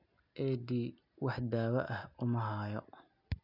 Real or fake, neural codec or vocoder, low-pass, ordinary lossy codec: real; none; 5.4 kHz; none